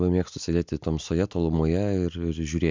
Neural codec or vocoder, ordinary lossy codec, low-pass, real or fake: none; MP3, 64 kbps; 7.2 kHz; real